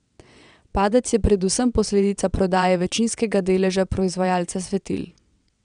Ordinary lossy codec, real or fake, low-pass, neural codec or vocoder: none; fake; 9.9 kHz; vocoder, 22.05 kHz, 80 mel bands, WaveNeXt